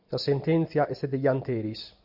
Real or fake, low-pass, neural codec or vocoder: real; 5.4 kHz; none